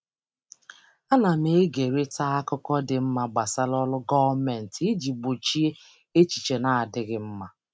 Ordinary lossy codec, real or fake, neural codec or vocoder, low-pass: none; real; none; none